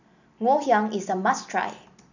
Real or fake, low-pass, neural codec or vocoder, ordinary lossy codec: real; 7.2 kHz; none; none